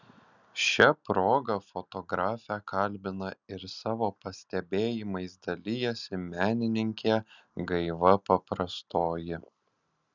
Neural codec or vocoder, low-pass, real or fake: none; 7.2 kHz; real